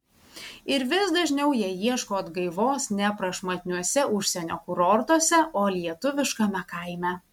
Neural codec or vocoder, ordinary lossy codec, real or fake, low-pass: none; MP3, 96 kbps; real; 19.8 kHz